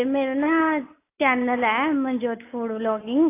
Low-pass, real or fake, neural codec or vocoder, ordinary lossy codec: 3.6 kHz; real; none; AAC, 24 kbps